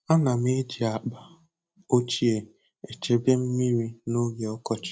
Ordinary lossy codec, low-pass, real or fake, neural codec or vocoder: none; none; real; none